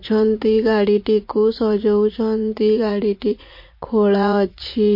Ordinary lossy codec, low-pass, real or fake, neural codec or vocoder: MP3, 32 kbps; 5.4 kHz; fake; vocoder, 44.1 kHz, 80 mel bands, Vocos